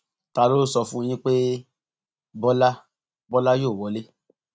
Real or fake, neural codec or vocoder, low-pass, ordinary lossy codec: real; none; none; none